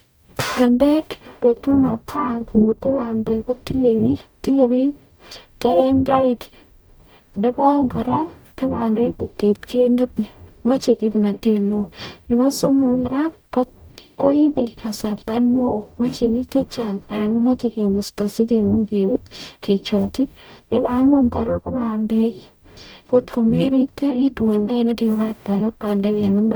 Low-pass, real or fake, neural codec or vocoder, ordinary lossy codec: none; fake; codec, 44.1 kHz, 0.9 kbps, DAC; none